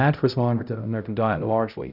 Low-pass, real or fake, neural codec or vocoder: 5.4 kHz; fake; codec, 16 kHz, 0.5 kbps, X-Codec, HuBERT features, trained on balanced general audio